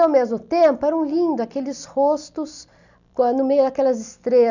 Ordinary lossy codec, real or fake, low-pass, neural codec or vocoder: none; real; 7.2 kHz; none